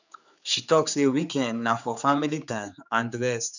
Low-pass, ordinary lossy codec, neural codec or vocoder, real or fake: 7.2 kHz; none; codec, 16 kHz, 4 kbps, X-Codec, HuBERT features, trained on general audio; fake